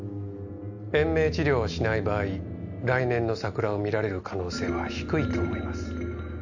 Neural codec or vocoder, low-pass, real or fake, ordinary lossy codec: none; 7.2 kHz; real; none